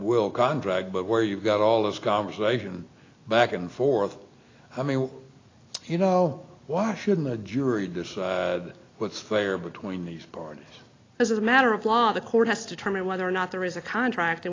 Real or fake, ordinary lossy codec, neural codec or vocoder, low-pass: real; AAC, 32 kbps; none; 7.2 kHz